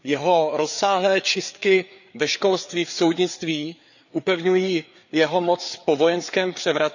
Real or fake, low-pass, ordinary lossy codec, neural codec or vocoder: fake; 7.2 kHz; none; codec, 16 kHz, 4 kbps, FreqCodec, larger model